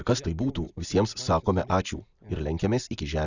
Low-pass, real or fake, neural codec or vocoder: 7.2 kHz; real; none